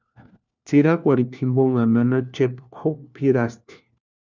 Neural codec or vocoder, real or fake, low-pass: codec, 16 kHz, 1 kbps, FunCodec, trained on LibriTTS, 50 frames a second; fake; 7.2 kHz